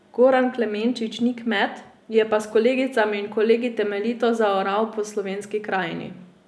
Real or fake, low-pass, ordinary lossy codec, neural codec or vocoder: real; none; none; none